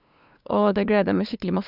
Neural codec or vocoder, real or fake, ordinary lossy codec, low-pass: codec, 16 kHz, 2 kbps, FunCodec, trained on LibriTTS, 25 frames a second; fake; none; 5.4 kHz